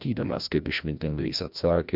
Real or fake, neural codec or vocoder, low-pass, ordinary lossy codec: fake; codec, 16 kHz, 1 kbps, FreqCodec, larger model; 5.4 kHz; MP3, 48 kbps